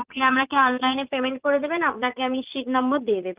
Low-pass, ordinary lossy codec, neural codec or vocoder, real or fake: 3.6 kHz; Opus, 32 kbps; vocoder, 44.1 kHz, 80 mel bands, Vocos; fake